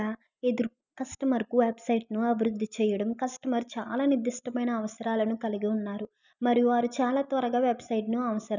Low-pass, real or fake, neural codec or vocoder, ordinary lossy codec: 7.2 kHz; real; none; none